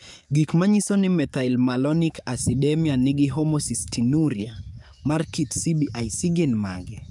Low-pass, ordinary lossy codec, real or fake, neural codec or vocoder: 10.8 kHz; none; fake; codec, 44.1 kHz, 7.8 kbps, DAC